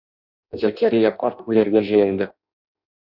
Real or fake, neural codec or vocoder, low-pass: fake; codec, 16 kHz in and 24 kHz out, 0.6 kbps, FireRedTTS-2 codec; 5.4 kHz